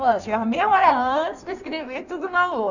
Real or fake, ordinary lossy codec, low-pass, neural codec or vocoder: fake; none; 7.2 kHz; codec, 16 kHz in and 24 kHz out, 1.1 kbps, FireRedTTS-2 codec